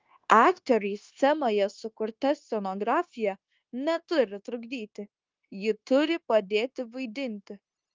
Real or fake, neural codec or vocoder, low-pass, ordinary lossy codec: fake; codec, 16 kHz, 0.9 kbps, LongCat-Audio-Codec; 7.2 kHz; Opus, 24 kbps